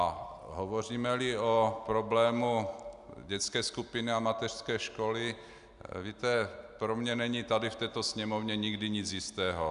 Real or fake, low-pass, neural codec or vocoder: real; 10.8 kHz; none